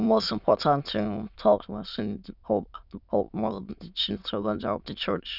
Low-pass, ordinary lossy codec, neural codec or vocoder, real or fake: 5.4 kHz; none; autoencoder, 22.05 kHz, a latent of 192 numbers a frame, VITS, trained on many speakers; fake